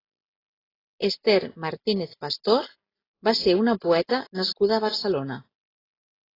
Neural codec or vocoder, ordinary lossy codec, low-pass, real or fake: none; AAC, 24 kbps; 5.4 kHz; real